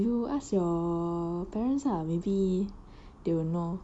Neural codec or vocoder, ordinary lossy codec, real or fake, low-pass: none; MP3, 96 kbps; real; 9.9 kHz